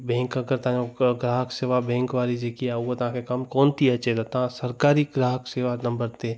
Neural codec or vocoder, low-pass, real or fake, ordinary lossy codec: none; none; real; none